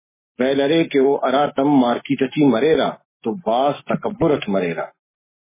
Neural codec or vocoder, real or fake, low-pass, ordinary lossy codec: codec, 44.1 kHz, 7.8 kbps, Pupu-Codec; fake; 3.6 kHz; MP3, 16 kbps